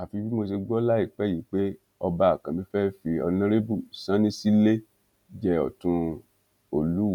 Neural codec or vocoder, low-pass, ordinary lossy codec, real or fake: none; 19.8 kHz; none; real